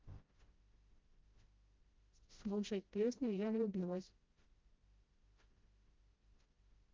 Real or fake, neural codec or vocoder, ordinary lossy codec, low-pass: fake; codec, 16 kHz, 0.5 kbps, FreqCodec, smaller model; Opus, 24 kbps; 7.2 kHz